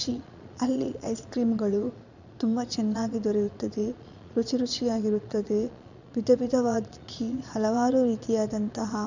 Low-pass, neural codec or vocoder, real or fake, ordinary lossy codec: 7.2 kHz; vocoder, 22.05 kHz, 80 mel bands, Vocos; fake; none